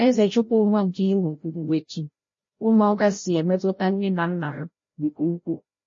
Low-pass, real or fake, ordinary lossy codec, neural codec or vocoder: 7.2 kHz; fake; MP3, 32 kbps; codec, 16 kHz, 0.5 kbps, FreqCodec, larger model